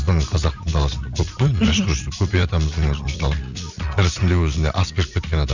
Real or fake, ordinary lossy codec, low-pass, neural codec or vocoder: fake; none; 7.2 kHz; vocoder, 44.1 kHz, 80 mel bands, Vocos